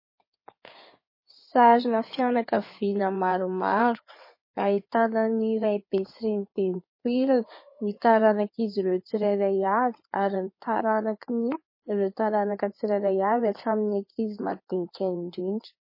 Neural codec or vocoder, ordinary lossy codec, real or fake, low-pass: codec, 16 kHz in and 24 kHz out, 2.2 kbps, FireRedTTS-2 codec; MP3, 24 kbps; fake; 5.4 kHz